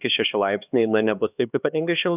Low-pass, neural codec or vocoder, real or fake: 3.6 kHz; codec, 16 kHz, 2 kbps, X-Codec, HuBERT features, trained on LibriSpeech; fake